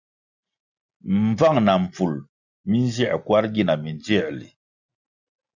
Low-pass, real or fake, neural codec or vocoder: 7.2 kHz; real; none